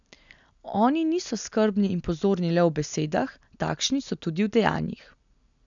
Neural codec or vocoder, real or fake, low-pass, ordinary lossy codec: none; real; 7.2 kHz; none